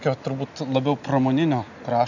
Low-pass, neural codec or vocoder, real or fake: 7.2 kHz; none; real